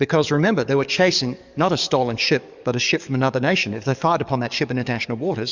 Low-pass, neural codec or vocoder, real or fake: 7.2 kHz; codec, 44.1 kHz, 7.8 kbps, DAC; fake